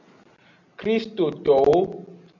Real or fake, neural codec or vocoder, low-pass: real; none; 7.2 kHz